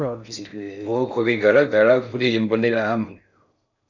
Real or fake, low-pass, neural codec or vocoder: fake; 7.2 kHz; codec, 16 kHz in and 24 kHz out, 0.6 kbps, FocalCodec, streaming, 4096 codes